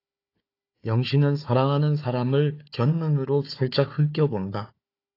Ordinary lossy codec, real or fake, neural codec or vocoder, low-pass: AAC, 32 kbps; fake; codec, 16 kHz, 4 kbps, FunCodec, trained on Chinese and English, 50 frames a second; 5.4 kHz